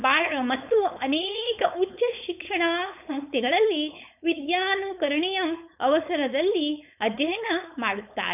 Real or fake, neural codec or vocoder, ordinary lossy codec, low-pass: fake; codec, 16 kHz, 4.8 kbps, FACodec; none; 3.6 kHz